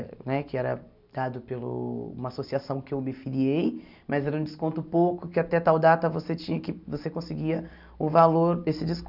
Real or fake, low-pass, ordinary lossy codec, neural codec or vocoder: real; 5.4 kHz; none; none